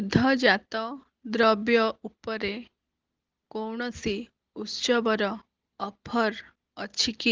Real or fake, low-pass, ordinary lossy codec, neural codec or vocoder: real; 7.2 kHz; Opus, 16 kbps; none